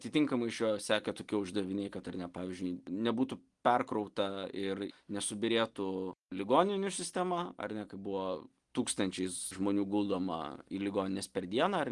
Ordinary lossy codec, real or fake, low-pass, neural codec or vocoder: Opus, 24 kbps; real; 10.8 kHz; none